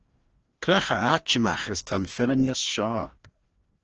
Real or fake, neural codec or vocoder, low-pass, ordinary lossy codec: fake; codec, 16 kHz, 1 kbps, FreqCodec, larger model; 7.2 kHz; Opus, 16 kbps